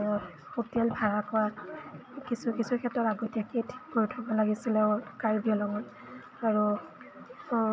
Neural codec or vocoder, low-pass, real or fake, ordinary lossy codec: none; none; real; none